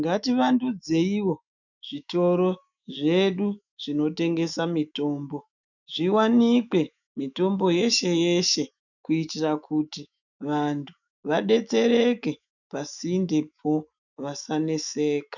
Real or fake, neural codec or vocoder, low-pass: fake; autoencoder, 48 kHz, 128 numbers a frame, DAC-VAE, trained on Japanese speech; 7.2 kHz